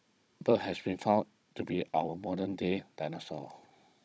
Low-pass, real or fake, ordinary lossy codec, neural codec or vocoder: none; fake; none; codec, 16 kHz, 16 kbps, FunCodec, trained on Chinese and English, 50 frames a second